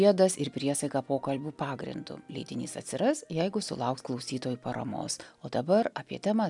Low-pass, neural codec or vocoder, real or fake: 10.8 kHz; none; real